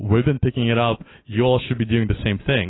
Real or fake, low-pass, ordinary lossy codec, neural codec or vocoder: real; 7.2 kHz; AAC, 16 kbps; none